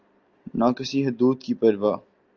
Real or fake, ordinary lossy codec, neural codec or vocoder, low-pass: real; Opus, 24 kbps; none; 7.2 kHz